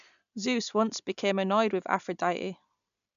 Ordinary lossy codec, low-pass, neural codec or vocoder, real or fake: none; 7.2 kHz; none; real